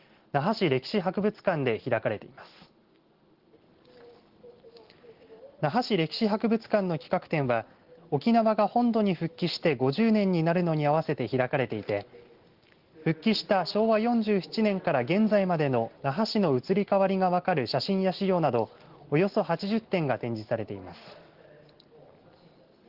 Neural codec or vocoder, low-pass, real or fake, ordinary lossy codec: none; 5.4 kHz; real; Opus, 16 kbps